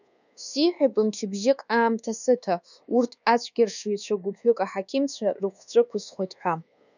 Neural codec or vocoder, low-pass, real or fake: codec, 24 kHz, 1.2 kbps, DualCodec; 7.2 kHz; fake